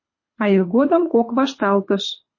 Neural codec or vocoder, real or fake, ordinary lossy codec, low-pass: codec, 24 kHz, 6 kbps, HILCodec; fake; MP3, 32 kbps; 7.2 kHz